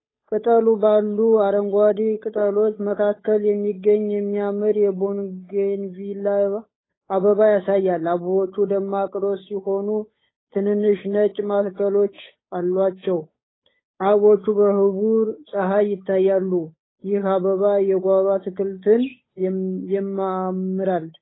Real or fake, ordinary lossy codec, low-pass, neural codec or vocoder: fake; AAC, 16 kbps; 7.2 kHz; codec, 16 kHz, 8 kbps, FunCodec, trained on Chinese and English, 25 frames a second